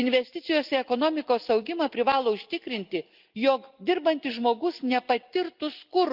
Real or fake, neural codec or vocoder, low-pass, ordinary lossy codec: real; none; 5.4 kHz; Opus, 32 kbps